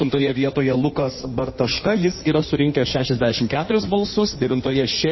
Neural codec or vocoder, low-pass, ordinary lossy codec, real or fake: codec, 16 kHz in and 24 kHz out, 1.1 kbps, FireRedTTS-2 codec; 7.2 kHz; MP3, 24 kbps; fake